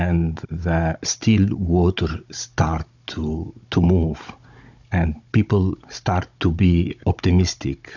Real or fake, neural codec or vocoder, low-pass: fake; codec, 16 kHz, 16 kbps, FunCodec, trained on Chinese and English, 50 frames a second; 7.2 kHz